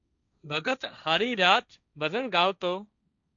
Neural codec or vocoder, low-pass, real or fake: codec, 16 kHz, 1.1 kbps, Voila-Tokenizer; 7.2 kHz; fake